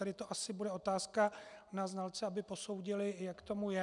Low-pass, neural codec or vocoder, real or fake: 10.8 kHz; none; real